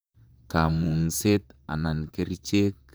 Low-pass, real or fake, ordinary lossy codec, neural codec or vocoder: none; fake; none; vocoder, 44.1 kHz, 128 mel bands, Pupu-Vocoder